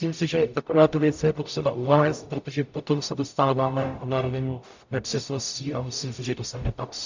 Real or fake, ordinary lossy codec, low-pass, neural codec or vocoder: fake; MP3, 64 kbps; 7.2 kHz; codec, 44.1 kHz, 0.9 kbps, DAC